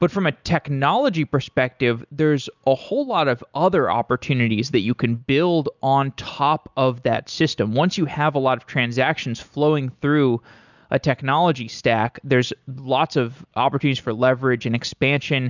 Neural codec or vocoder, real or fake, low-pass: none; real; 7.2 kHz